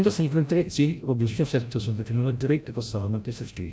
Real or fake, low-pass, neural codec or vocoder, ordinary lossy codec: fake; none; codec, 16 kHz, 0.5 kbps, FreqCodec, larger model; none